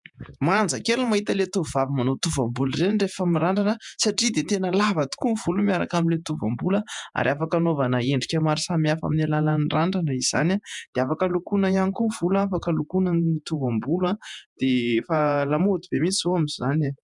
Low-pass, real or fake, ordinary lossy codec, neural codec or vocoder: 10.8 kHz; fake; MP3, 96 kbps; vocoder, 48 kHz, 128 mel bands, Vocos